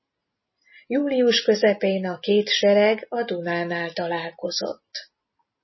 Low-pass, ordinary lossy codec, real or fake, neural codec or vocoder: 7.2 kHz; MP3, 24 kbps; real; none